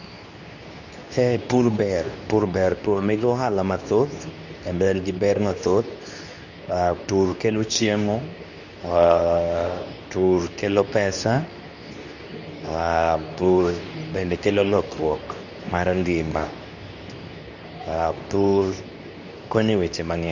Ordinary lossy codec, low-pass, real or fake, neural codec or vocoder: none; 7.2 kHz; fake; codec, 24 kHz, 0.9 kbps, WavTokenizer, medium speech release version 2